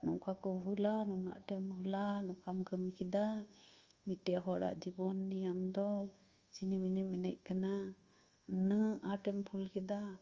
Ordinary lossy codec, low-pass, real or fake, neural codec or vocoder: Opus, 32 kbps; 7.2 kHz; fake; codec, 24 kHz, 1.2 kbps, DualCodec